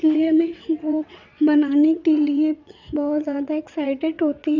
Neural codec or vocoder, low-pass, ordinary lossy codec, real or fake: vocoder, 22.05 kHz, 80 mel bands, WaveNeXt; 7.2 kHz; none; fake